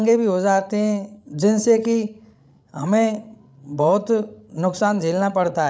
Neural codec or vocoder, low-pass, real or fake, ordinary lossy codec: codec, 16 kHz, 16 kbps, FreqCodec, larger model; none; fake; none